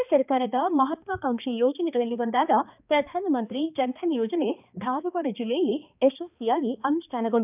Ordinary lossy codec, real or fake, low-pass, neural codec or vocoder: none; fake; 3.6 kHz; codec, 16 kHz, 2 kbps, X-Codec, HuBERT features, trained on balanced general audio